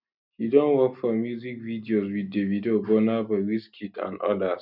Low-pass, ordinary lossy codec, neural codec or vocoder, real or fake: 5.4 kHz; none; none; real